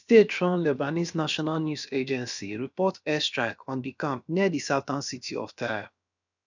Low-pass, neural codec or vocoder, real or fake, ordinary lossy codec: 7.2 kHz; codec, 16 kHz, about 1 kbps, DyCAST, with the encoder's durations; fake; none